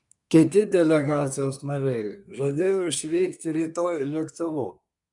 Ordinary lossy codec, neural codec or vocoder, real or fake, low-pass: MP3, 96 kbps; codec, 24 kHz, 1 kbps, SNAC; fake; 10.8 kHz